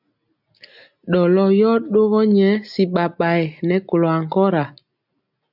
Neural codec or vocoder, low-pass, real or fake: none; 5.4 kHz; real